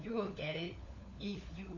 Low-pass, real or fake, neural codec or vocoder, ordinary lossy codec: 7.2 kHz; fake; codec, 16 kHz, 4 kbps, FunCodec, trained on LibriTTS, 50 frames a second; none